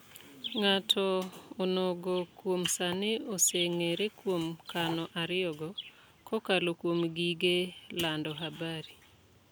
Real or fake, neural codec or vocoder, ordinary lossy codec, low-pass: real; none; none; none